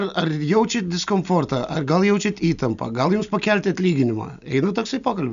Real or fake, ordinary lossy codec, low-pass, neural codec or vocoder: real; MP3, 96 kbps; 7.2 kHz; none